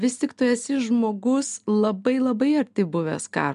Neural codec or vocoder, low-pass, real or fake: none; 10.8 kHz; real